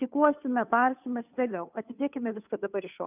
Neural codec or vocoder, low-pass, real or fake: codec, 16 kHz, 2 kbps, FunCodec, trained on Chinese and English, 25 frames a second; 3.6 kHz; fake